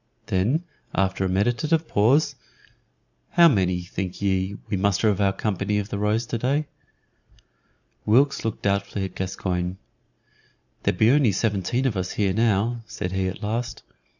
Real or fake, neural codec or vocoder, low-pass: fake; vocoder, 44.1 kHz, 128 mel bands every 512 samples, BigVGAN v2; 7.2 kHz